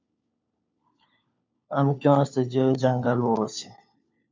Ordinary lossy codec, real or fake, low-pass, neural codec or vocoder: MP3, 64 kbps; fake; 7.2 kHz; codec, 16 kHz, 4 kbps, FunCodec, trained on LibriTTS, 50 frames a second